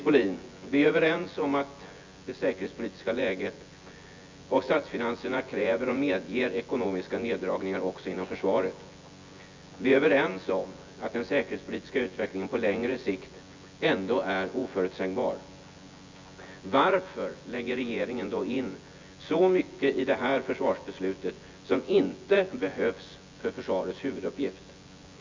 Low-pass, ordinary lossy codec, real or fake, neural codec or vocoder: 7.2 kHz; MP3, 64 kbps; fake; vocoder, 24 kHz, 100 mel bands, Vocos